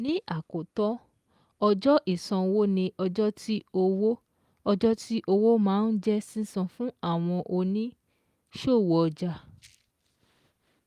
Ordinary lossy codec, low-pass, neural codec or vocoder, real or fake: Opus, 32 kbps; 14.4 kHz; none; real